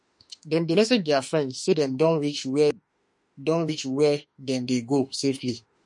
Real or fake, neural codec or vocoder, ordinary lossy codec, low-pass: fake; autoencoder, 48 kHz, 32 numbers a frame, DAC-VAE, trained on Japanese speech; MP3, 48 kbps; 10.8 kHz